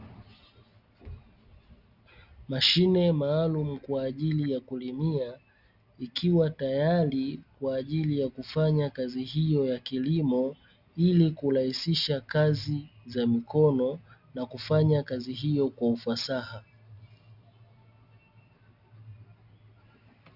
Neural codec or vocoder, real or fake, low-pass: none; real; 5.4 kHz